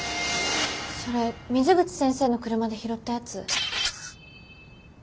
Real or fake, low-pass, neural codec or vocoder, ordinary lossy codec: real; none; none; none